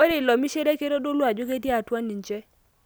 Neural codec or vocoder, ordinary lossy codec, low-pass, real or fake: none; none; none; real